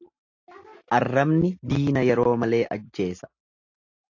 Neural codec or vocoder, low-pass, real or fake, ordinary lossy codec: none; 7.2 kHz; real; AAC, 48 kbps